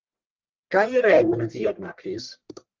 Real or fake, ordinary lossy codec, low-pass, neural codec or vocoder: fake; Opus, 24 kbps; 7.2 kHz; codec, 44.1 kHz, 1.7 kbps, Pupu-Codec